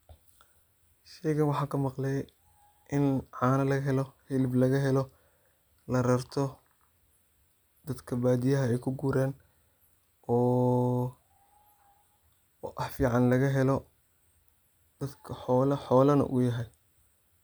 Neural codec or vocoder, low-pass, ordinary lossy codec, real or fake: none; none; none; real